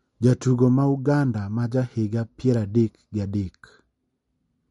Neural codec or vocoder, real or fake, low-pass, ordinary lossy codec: none; real; 19.8 kHz; MP3, 48 kbps